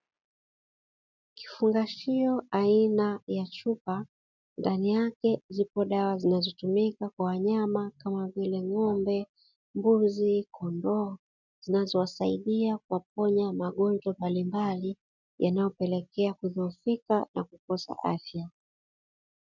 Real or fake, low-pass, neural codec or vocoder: real; 7.2 kHz; none